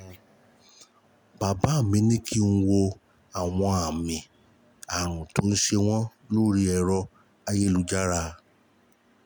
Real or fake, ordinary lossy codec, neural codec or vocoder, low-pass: real; none; none; none